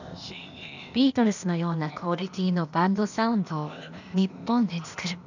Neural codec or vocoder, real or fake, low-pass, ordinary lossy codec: codec, 16 kHz, 0.8 kbps, ZipCodec; fake; 7.2 kHz; none